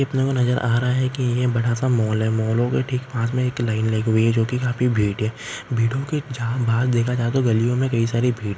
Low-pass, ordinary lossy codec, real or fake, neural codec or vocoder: none; none; real; none